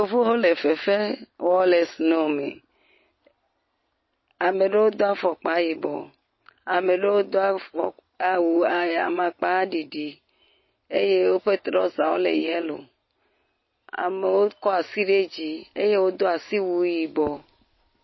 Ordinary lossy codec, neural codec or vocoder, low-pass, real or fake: MP3, 24 kbps; none; 7.2 kHz; real